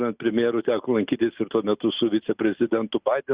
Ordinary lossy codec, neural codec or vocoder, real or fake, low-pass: Opus, 64 kbps; none; real; 3.6 kHz